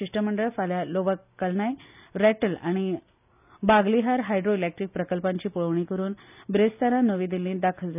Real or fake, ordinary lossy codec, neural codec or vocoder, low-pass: real; none; none; 3.6 kHz